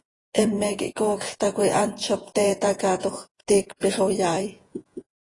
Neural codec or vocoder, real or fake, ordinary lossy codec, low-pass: vocoder, 48 kHz, 128 mel bands, Vocos; fake; AAC, 32 kbps; 10.8 kHz